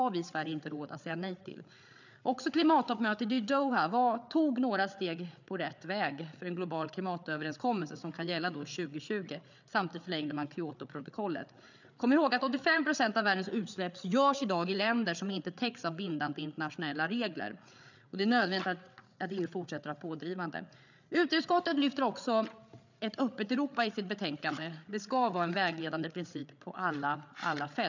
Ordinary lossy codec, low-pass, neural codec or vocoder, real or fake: none; 7.2 kHz; codec, 16 kHz, 8 kbps, FreqCodec, larger model; fake